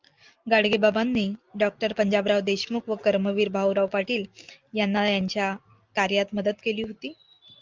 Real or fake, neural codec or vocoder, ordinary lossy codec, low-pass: real; none; Opus, 24 kbps; 7.2 kHz